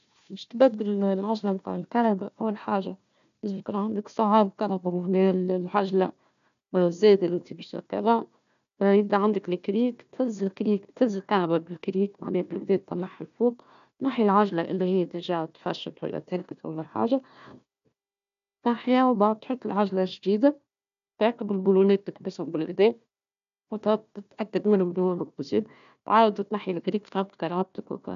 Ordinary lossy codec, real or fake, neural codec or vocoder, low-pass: none; fake; codec, 16 kHz, 1 kbps, FunCodec, trained on Chinese and English, 50 frames a second; 7.2 kHz